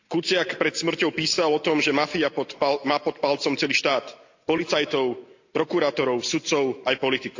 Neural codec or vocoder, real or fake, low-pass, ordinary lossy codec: none; real; 7.2 kHz; AAC, 48 kbps